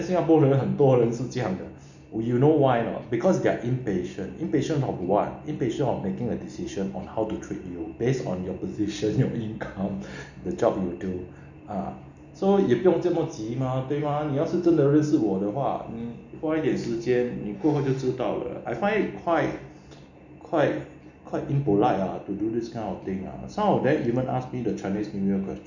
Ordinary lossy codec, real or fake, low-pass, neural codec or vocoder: none; real; 7.2 kHz; none